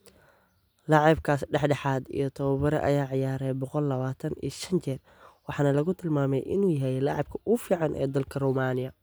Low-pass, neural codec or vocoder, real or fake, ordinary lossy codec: none; none; real; none